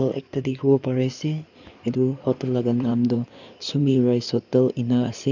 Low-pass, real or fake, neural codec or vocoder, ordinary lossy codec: 7.2 kHz; fake; codec, 16 kHz in and 24 kHz out, 2.2 kbps, FireRedTTS-2 codec; none